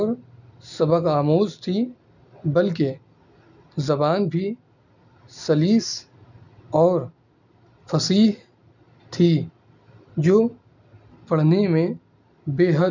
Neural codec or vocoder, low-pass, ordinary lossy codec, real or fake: none; 7.2 kHz; none; real